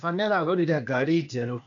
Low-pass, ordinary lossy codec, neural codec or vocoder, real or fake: 7.2 kHz; none; codec, 16 kHz, 0.8 kbps, ZipCodec; fake